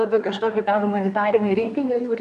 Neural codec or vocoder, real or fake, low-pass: codec, 24 kHz, 1 kbps, SNAC; fake; 10.8 kHz